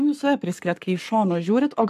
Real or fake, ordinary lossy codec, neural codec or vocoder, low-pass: fake; MP3, 96 kbps; codec, 44.1 kHz, 7.8 kbps, Pupu-Codec; 14.4 kHz